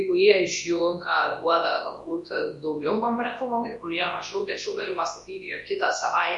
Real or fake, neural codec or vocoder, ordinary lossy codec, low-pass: fake; codec, 24 kHz, 0.9 kbps, WavTokenizer, large speech release; MP3, 48 kbps; 10.8 kHz